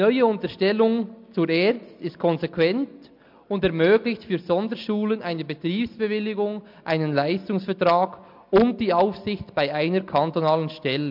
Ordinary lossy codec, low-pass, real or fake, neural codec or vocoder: MP3, 48 kbps; 5.4 kHz; real; none